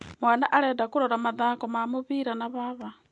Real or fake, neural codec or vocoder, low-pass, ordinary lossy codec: real; none; 10.8 kHz; MP3, 64 kbps